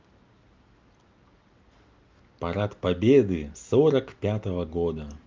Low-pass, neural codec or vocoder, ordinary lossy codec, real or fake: 7.2 kHz; none; Opus, 24 kbps; real